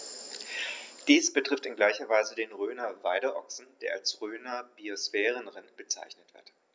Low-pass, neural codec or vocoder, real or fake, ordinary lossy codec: none; none; real; none